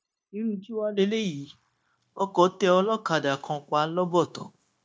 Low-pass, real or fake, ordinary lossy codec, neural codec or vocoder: none; fake; none; codec, 16 kHz, 0.9 kbps, LongCat-Audio-Codec